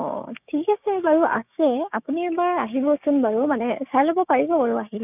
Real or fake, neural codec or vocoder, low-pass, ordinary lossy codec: fake; codec, 16 kHz, 6 kbps, DAC; 3.6 kHz; none